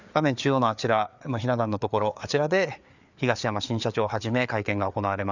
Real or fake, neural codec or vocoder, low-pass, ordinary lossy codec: fake; codec, 16 kHz, 4 kbps, FreqCodec, larger model; 7.2 kHz; none